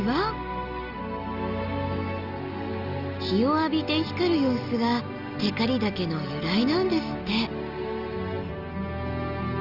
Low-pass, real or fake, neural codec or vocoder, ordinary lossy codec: 5.4 kHz; real; none; Opus, 24 kbps